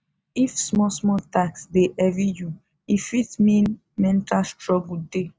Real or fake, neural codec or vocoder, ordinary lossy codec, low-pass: real; none; none; none